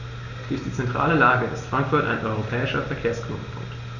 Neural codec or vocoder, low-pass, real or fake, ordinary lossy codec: none; 7.2 kHz; real; none